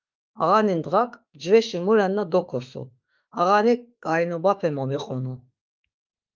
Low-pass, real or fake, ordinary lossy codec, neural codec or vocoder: 7.2 kHz; fake; Opus, 32 kbps; autoencoder, 48 kHz, 32 numbers a frame, DAC-VAE, trained on Japanese speech